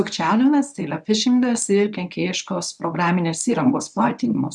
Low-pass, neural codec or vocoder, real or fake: 10.8 kHz; codec, 24 kHz, 0.9 kbps, WavTokenizer, medium speech release version 1; fake